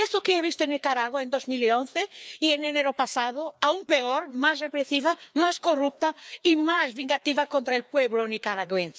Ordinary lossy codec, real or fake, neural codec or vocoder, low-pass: none; fake; codec, 16 kHz, 2 kbps, FreqCodec, larger model; none